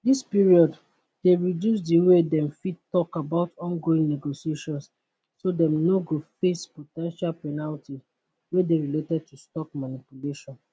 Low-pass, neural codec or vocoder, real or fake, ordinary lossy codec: none; none; real; none